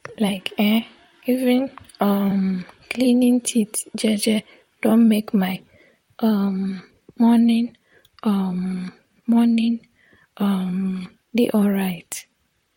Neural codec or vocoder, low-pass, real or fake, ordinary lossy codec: vocoder, 44.1 kHz, 128 mel bands, Pupu-Vocoder; 19.8 kHz; fake; MP3, 64 kbps